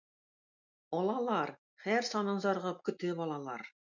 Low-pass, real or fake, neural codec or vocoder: 7.2 kHz; real; none